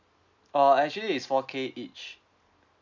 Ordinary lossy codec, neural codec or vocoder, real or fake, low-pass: none; none; real; 7.2 kHz